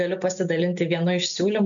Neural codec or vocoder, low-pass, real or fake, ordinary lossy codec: none; 7.2 kHz; real; MP3, 64 kbps